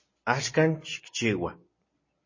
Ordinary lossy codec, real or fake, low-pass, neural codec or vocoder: MP3, 32 kbps; real; 7.2 kHz; none